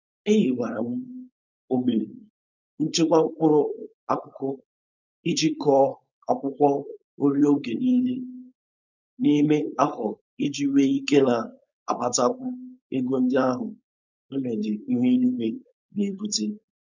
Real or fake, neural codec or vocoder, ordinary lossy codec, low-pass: fake; codec, 16 kHz, 4.8 kbps, FACodec; none; 7.2 kHz